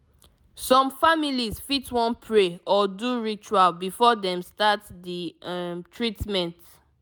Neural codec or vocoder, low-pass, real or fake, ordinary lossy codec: none; none; real; none